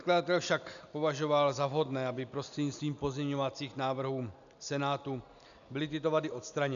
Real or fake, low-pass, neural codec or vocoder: real; 7.2 kHz; none